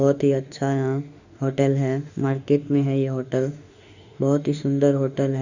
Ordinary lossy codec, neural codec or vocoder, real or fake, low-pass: Opus, 64 kbps; autoencoder, 48 kHz, 32 numbers a frame, DAC-VAE, trained on Japanese speech; fake; 7.2 kHz